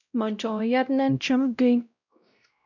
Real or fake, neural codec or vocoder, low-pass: fake; codec, 16 kHz, 0.5 kbps, X-Codec, WavLM features, trained on Multilingual LibriSpeech; 7.2 kHz